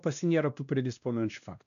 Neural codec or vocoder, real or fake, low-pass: codec, 16 kHz, 1 kbps, X-Codec, WavLM features, trained on Multilingual LibriSpeech; fake; 7.2 kHz